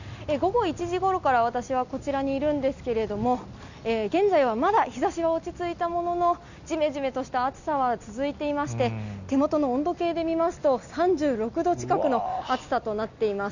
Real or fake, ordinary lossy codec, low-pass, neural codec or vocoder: real; none; 7.2 kHz; none